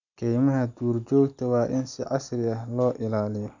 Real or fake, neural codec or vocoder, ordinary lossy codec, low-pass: real; none; none; 7.2 kHz